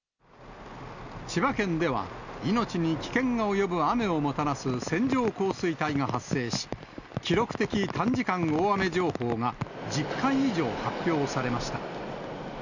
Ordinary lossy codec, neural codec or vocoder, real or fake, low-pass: none; none; real; 7.2 kHz